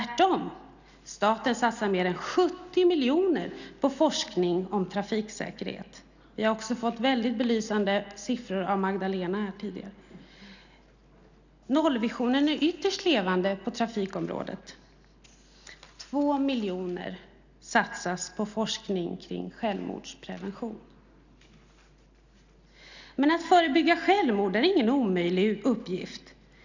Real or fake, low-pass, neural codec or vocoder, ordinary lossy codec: real; 7.2 kHz; none; none